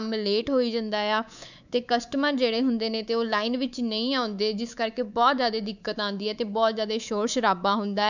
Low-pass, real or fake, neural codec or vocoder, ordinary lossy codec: 7.2 kHz; fake; codec, 24 kHz, 3.1 kbps, DualCodec; none